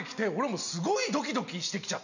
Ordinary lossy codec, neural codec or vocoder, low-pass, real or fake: none; none; 7.2 kHz; real